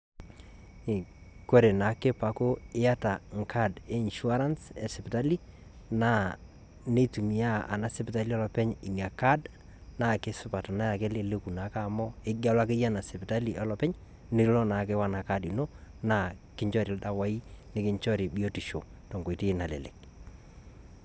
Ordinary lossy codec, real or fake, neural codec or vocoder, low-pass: none; real; none; none